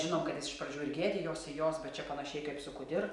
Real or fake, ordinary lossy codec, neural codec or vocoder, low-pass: real; MP3, 96 kbps; none; 10.8 kHz